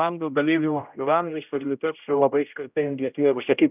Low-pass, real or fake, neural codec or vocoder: 3.6 kHz; fake; codec, 16 kHz, 0.5 kbps, X-Codec, HuBERT features, trained on general audio